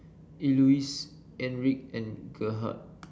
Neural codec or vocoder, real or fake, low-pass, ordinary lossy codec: none; real; none; none